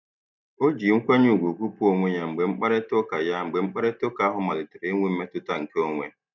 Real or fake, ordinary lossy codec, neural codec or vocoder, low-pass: real; none; none; 7.2 kHz